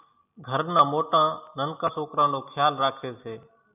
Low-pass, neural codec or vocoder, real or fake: 3.6 kHz; none; real